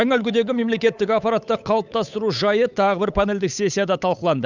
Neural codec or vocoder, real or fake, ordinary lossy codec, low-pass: codec, 16 kHz, 8 kbps, FunCodec, trained on Chinese and English, 25 frames a second; fake; none; 7.2 kHz